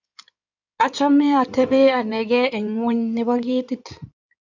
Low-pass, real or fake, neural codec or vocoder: 7.2 kHz; fake; codec, 16 kHz in and 24 kHz out, 2.2 kbps, FireRedTTS-2 codec